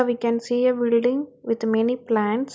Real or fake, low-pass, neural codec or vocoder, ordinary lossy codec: real; none; none; none